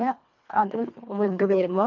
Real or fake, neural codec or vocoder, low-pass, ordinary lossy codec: fake; codec, 24 kHz, 1.5 kbps, HILCodec; 7.2 kHz; none